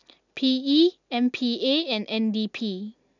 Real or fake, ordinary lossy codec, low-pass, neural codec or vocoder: real; none; 7.2 kHz; none